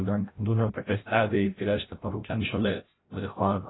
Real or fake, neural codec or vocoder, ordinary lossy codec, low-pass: fake; codec, 16 kHz, 0.5 kbps, FreqCodec, larger model; AAC, 16 kbps; 7.2 kHz